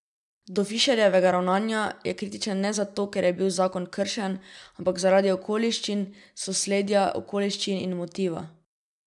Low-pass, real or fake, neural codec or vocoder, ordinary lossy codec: 10.8 kHz; real; none; none